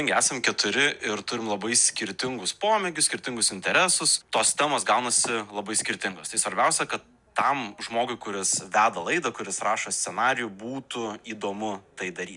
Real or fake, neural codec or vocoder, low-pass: real; none; 10.8 kHz